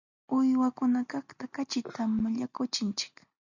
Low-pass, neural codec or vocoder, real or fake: 7.2 kHz; none; real